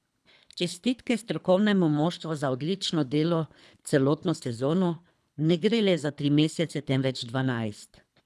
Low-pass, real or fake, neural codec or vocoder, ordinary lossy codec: none; fake; codec, 24 kHz, 3 kbps, HILCodec; none